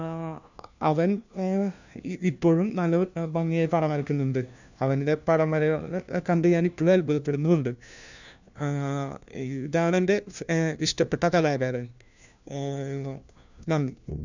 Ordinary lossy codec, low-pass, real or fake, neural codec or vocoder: none; 7.2 kHz; fake; codec, 16 kHz, 1 kbps, FunCodec, trained on LibriTTS, 50 frames a second